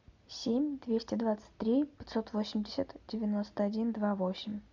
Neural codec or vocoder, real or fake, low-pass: none; real; 7.2 kHz